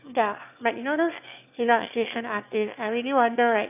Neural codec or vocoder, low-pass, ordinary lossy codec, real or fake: autoencoder, 22.05 kHz, a latent of 192 numbers a frame, VITS, trained on one speaker; 3.6 kHz; none; fake